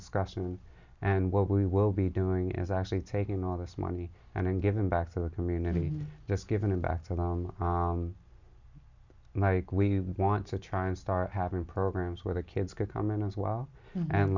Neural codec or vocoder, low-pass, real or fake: none; 7.2 kHz; real